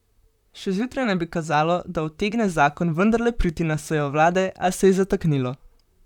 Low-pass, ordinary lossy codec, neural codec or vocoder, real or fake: 19.8 kHz; none; vocoder, 44.1 kHz, 128 mel bands, Pupu-Vocoder; fake